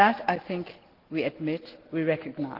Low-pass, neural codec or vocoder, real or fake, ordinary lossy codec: 5.4 kHz; none; real; Opus, 16 kbps